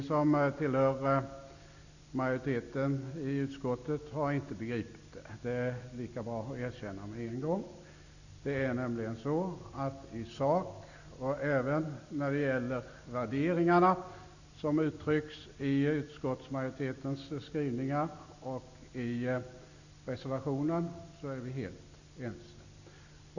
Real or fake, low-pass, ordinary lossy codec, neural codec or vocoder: real; 7.2 kHz; none; none